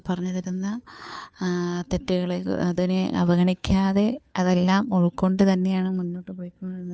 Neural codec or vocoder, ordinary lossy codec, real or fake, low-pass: codec, 16 kHz, 2 kbps, FunCodec, trained on Chinese and English, 25 frames a second; none; fake; none